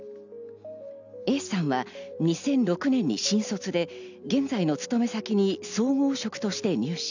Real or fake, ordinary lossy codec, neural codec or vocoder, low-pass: real; none; none; 7.2 kHz